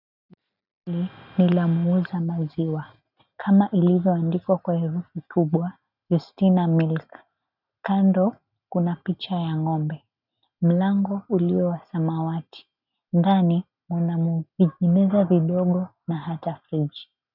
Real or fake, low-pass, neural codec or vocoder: real; 5.4 kHz; none